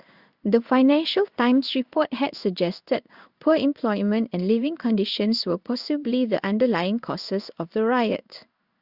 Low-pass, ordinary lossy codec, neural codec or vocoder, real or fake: 5.4 kHz; Opus, 64 kbps; codec, 16 kHz in and 24 kHz out, 1 kbps, XY-Tokenizer; fake